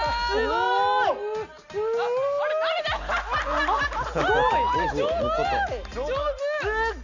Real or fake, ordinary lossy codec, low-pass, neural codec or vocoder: real; none; 7.2 kHz; none